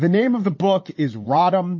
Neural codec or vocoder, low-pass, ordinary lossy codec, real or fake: vocoder, 22.05 kHz, 80 mel bands, Vocos; 7.2 kHz; MP3, 32 kbps; fake